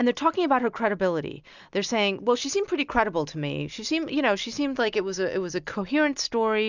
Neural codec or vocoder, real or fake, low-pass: none; real; 7.2 kHz